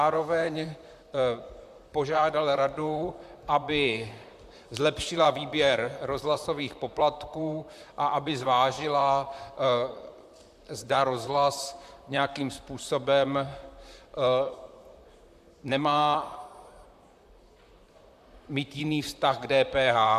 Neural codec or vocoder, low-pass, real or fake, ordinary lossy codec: vocoder, 44.1 kHz, 128 mel bands, Pupu-Vocoder; 14.4 kHz; fake; AAC, 96 kbps